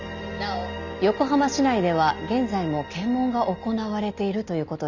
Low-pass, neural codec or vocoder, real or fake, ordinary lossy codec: 7.2 kHz; none; real; none